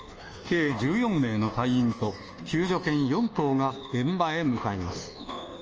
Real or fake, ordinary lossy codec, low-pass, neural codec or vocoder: fake; Opus, 24 kbps; 7.2 kHz; codec, 24 kHz, 1.2 kbps, DualCodec